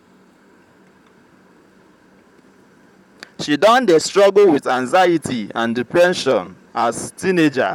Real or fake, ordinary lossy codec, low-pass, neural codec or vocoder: fake; none; 19.8 kHz; vocoder, 44.1 kHz, 128 mel bands, Pupu-Vocoder